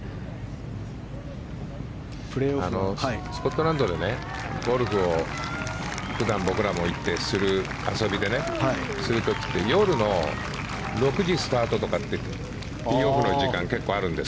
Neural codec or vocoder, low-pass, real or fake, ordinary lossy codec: none; none; real; none